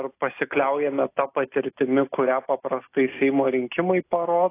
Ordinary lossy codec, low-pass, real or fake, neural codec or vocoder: AAC, 24 kbps; 3.6 kHz; real; none